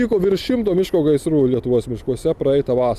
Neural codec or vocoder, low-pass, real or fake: none; 14.4 kHz; real